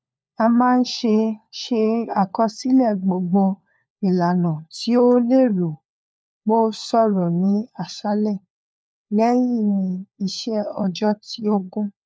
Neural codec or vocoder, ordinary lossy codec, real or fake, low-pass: codec, 16 kHz, 4 kbps, FunCodec, trained on LibriTTS, 50 frames a second; none; fake; none